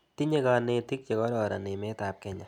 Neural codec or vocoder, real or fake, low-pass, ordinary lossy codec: none; real; 19.8 kHz; none